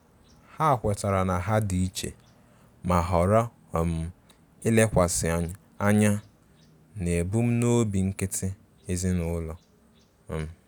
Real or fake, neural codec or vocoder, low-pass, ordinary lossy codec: real; none; none; none